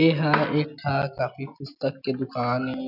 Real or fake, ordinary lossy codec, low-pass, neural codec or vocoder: real; none; 5.4 kHz; none